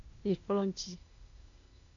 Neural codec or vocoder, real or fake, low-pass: codec, 16 kHz, 0.8 kbps, ZipCodec; fake; 7.2 kHz